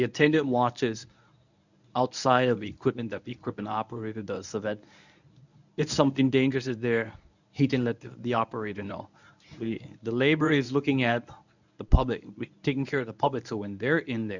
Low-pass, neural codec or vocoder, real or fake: 7.2 kHz; codec, 24 kHz, 0.9 kbps, WavTokenizer, medium speech release version 1; fake